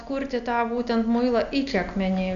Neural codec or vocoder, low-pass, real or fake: none; 7.2 kHz; real